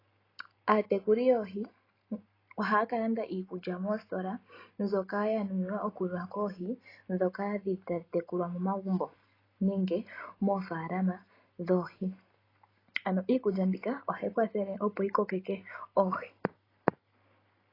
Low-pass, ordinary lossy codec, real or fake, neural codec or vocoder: 5.4 kHz; AAC, 24 kbps; real; none